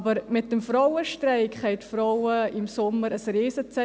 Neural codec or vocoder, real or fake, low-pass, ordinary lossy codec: none; real; none; none